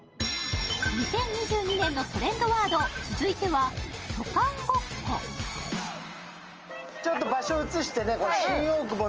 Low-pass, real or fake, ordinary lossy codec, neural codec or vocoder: 7.2 kHz; real; Opus, 24 kbps; none